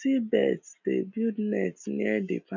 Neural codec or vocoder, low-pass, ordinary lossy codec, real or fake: none; 7.2 kHz; none; real